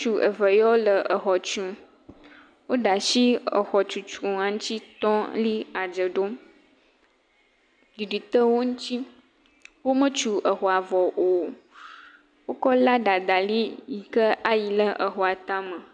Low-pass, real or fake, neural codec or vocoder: 9.9 kHz; real; none